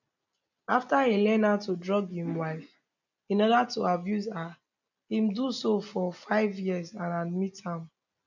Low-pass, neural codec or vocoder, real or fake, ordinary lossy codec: 7.2 kHz; none; real; none